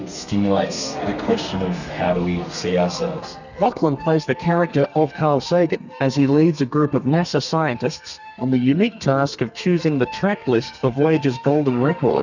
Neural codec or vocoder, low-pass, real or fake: codec, 32 kHz, 1.9 kbps, SNAC; 7.2 kHz; fake